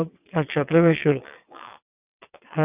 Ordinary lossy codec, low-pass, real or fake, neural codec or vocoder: none; 3.6 kHz; fake; vocoder, 22.05 kHz, 80 mel bands, WaveNeXt